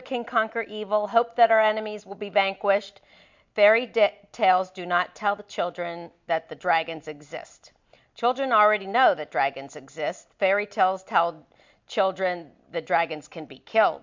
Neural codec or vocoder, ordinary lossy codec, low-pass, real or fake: none; MP3, 64 kbps; 7.2 kHz; real